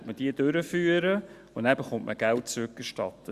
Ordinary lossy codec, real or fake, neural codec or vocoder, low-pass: Opus, 64 kbps; real; none; 14.4 kHz